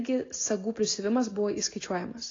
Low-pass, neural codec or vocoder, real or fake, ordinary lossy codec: 7.2 kHz; none; real; AAC, 32 kbps